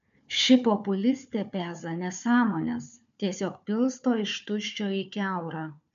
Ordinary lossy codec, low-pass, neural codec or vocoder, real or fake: MP3, 64 kbps; 7.2 kHz; codec, 16 kHz, 4 kbps, FunCodec, trained on Chinese and English, 50 frames a second; fake